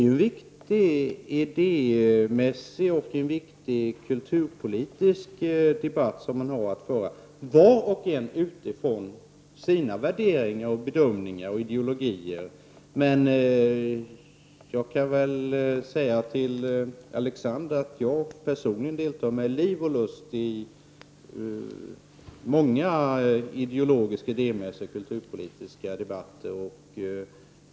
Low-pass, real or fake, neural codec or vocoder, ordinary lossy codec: none; real; none; none